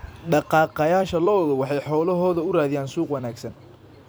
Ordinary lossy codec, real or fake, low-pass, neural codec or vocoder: none; fake; none; vocoder, 44.1 kHz, 128 mel bands every 256 samples, BigVGAN v2